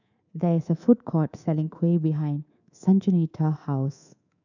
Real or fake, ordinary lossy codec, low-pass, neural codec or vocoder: fake; none; 7.2 kHz; codec, 24 kHz, 3.1 kbps, DualCodec